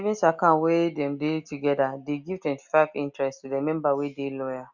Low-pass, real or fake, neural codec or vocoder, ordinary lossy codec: 7.2 kHz; real; none; Opus, 64 kbps